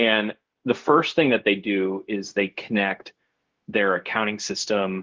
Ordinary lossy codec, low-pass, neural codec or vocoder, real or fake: Opus, 16 kbps; 7.2 kHz; codec, 16 kHz, 0.4 kbps, LongCat-Audio-Codec; fake